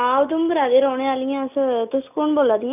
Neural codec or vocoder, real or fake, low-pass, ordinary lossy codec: none; real; 3.6 kHz; none